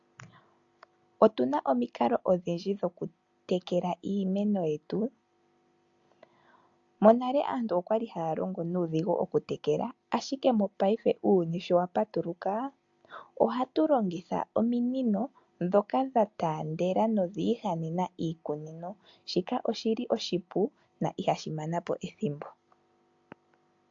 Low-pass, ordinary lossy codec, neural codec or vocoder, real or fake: 7.2 kHz; AAC, 64 kbps; none; real